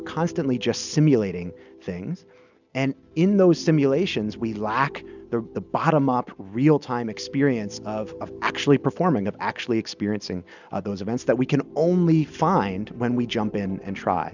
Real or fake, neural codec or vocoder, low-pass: real; none; 7.2 kHz